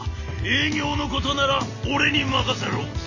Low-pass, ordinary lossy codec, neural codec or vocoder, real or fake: 7.2 kHz; none; none; real